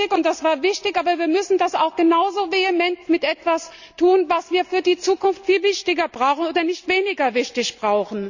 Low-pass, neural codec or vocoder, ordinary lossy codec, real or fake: 7.2 kHz; none; none; real